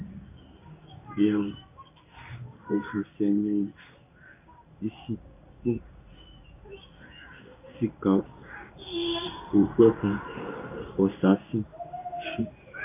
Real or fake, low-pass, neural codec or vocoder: fake; 3.6 kHz; codec, 16 kHz in and 24 kHz out, 1 kbps, XY-Tokenizer